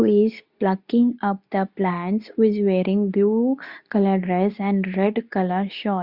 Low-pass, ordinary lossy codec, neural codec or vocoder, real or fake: 5.4 kHz; Opus, 64 kbps; codec, 24 kHz, 0.9 kbps, WavTokenizer, medium speech release version 2; fake